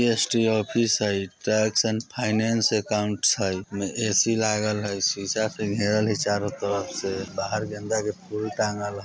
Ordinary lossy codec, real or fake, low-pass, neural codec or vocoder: none; real; none; none